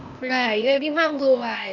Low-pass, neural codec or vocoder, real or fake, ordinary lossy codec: 7.2 kHz; codec, 16 kHz, 0.8 kbps, ZipCodec; fake; none